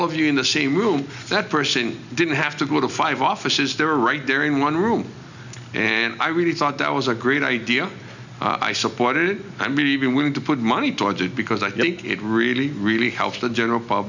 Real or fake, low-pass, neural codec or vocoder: real; 7.2 kHz; none